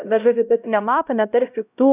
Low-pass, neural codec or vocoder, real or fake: 3.6 kHz; codec, 16 kHz, 0.5 kbps, X-Codec, HuBERT features, trained on LibriSpeech; fake